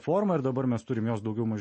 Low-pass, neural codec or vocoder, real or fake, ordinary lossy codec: 10.8 kHz; none; real; MP3, 32 kbps